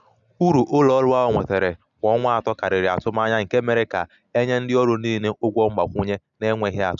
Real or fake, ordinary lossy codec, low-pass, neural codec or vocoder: real; none; 7.2 kHz; none